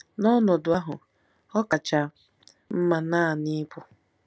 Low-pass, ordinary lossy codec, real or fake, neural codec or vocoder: none; none; real; none